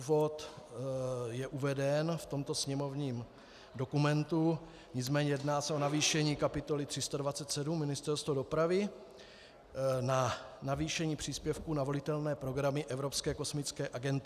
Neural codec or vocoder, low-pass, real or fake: none; 14.4 kHz; real